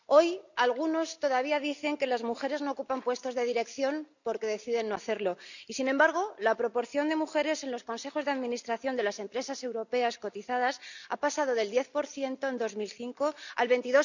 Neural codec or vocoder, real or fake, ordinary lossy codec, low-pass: none; real; none; 7.2 kHz